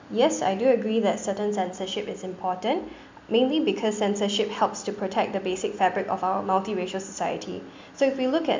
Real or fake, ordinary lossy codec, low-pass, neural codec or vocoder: real; MP3, 64 kbps; 7.2 kHz; none